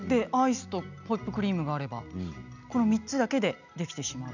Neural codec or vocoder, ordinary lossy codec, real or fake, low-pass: none; none; real; 7.2 kHz